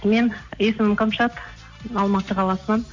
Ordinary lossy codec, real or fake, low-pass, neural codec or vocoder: MP3, 48 kbps; real; 7.2 kHz; none